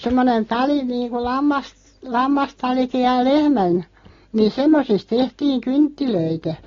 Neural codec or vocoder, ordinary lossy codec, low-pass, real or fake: none; AAC, 32 kbps; 7.2 kHz; real